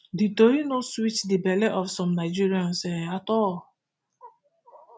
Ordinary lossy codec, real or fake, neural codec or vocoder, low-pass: none; real; none; none